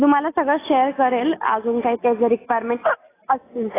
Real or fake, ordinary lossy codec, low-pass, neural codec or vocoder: real; AAC, 16 kbps; 3.6 kHz; none